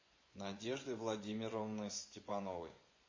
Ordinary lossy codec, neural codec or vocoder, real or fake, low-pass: MP3, 32 kbps; vocoder, 24 kHz, 100 mel bands, Vocos; fake; 7.2 kHz